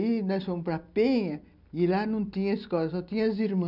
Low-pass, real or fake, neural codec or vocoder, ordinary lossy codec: 5.4 kHz; real; none; none